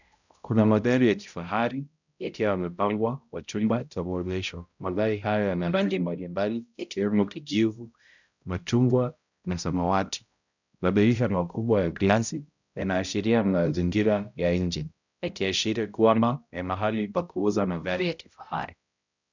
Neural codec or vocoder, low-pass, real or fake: codec, 16 kHz, 0.5 kbps, X-Codec, HuBERT features, trained on balanced general audio; 7.2 kHz; fake